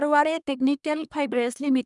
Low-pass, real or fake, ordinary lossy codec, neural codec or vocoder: 10.8 kHz; fake; none; codec, 24 kHz, 1 kbps, SNAC